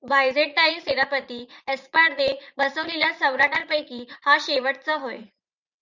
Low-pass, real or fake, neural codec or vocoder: 7.2 kHz; fake; vocoder, 44.1 kHz, 80 mel bands, Vocos